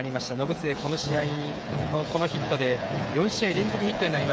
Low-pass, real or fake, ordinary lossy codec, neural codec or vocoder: none; fake; none; codec, 16 kHz, 8 kbps, FreqCodec, smaller model